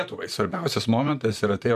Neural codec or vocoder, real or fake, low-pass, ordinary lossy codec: vocoder, 44.1 kHz, 128 mel bands, Pupu-Vocoder; fake; 14.4 kHz; MP3, 96 kbps